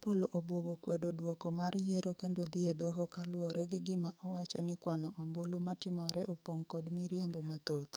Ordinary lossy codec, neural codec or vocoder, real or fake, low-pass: none; codec, 44.1 kHz, 2.6 kbps, SNAC; fake; none